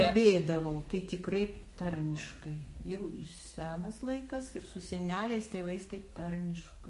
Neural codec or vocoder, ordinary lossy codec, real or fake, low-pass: codec, 32 kHz, 1.9 kbps, SNAC; MP3, 48 kbps; fake; 14.4 kHz